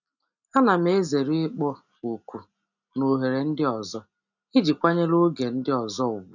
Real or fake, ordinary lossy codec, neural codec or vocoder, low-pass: real; none; none; 7.2 kHz